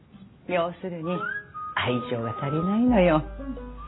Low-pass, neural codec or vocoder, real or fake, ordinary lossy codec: 7.2 kHz; none; real; AAC, 16 kbps